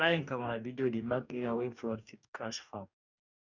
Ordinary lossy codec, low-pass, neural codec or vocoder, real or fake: none; 7.2 kHz; codec, 44.1 kHz, 2.6 kbps, DAC; fake